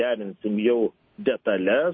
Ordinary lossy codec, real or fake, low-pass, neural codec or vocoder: MP3, 24 kbps; real; 7.2 kHz; none